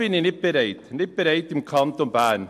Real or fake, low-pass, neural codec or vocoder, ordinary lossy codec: real; 14.4 kHz; none; MP3, 64 kbps